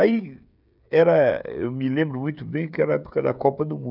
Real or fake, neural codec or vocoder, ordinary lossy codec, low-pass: fake; codec, 16 kHz, 16 kbps, FreqCodec, smaller model; none; 5.4 kHz